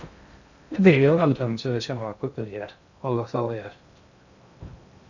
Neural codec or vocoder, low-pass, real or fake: codec, 16 kHz in and 24 kHz out, 0.6 kbps, FocalCodec, streaming, 2048 codes; 7.2 kHz; fake